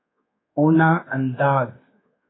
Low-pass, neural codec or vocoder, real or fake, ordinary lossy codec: 7.2 kHz; codec, 24 kHz, 1.2 kbps, DualCodec; fake; AAC, 16 kbps